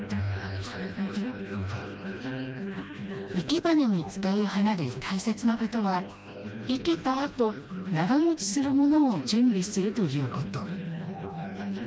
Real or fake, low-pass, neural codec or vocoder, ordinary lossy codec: fake; none; codec, 16 kHz, 1 kbps, FreqCodec, smaller model; none